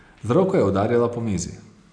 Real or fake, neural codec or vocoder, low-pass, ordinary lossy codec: real; none; 9.9 kHz; none